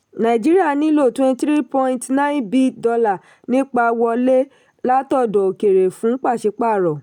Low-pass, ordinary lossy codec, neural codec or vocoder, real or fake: 19.8 kHz; none; none; real